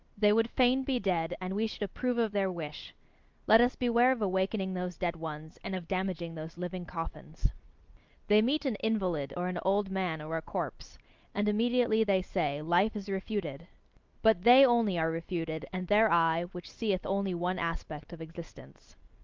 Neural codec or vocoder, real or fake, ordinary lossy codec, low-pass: none; real; Opus, 24 kbps; 7.2 kHz